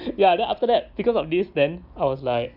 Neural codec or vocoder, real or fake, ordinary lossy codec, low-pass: none; real; Opus, 64 kbps; 5.4 kHz